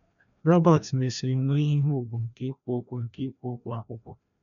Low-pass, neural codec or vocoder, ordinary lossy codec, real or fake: 7.2 kHz; codec, 16 kHz, 1 kbps, FreqCodec, larger model; none; fake